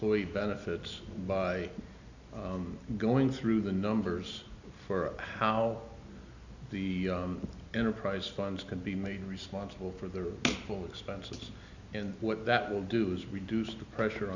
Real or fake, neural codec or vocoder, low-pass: real; none; 7.2 kHz